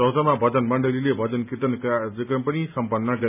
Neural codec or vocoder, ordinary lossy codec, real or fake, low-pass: none; none; real; 3.6 kHz